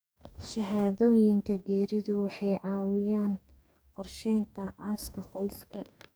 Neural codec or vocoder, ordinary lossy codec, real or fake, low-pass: codec, 44.1 kHz, 2.6 kbps, DAC; none; fake; none